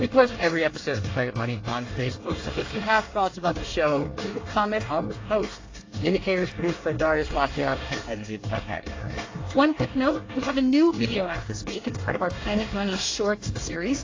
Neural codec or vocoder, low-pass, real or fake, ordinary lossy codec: codec, 24 kHz, 1 kbps, SNAC; 7.2 kHz; fake; AAC, 32 kbps